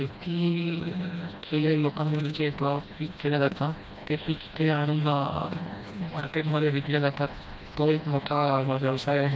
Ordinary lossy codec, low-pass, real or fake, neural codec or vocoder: none; none; fake; codec, 16 kHz, 1 kbps, FreqCodec, smaller model